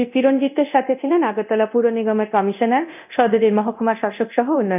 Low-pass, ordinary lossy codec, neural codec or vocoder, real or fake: 3.6 kHz; none; codec, 24 kHz, 0.9 kbps, DualCodec; fake